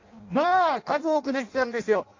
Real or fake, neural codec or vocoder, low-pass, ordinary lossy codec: fake; codec, 16 kHz in and 24 kHz out, 0.6 kbps, FireRedTTS-2 codec; 7.2 kHz; AAC, 48 kbps